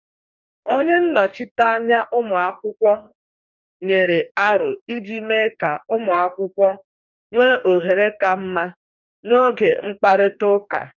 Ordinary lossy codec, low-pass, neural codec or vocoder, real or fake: none; 7.2 kHz; codec, 44.1 kHz, 2.6 kbps, DAC; fake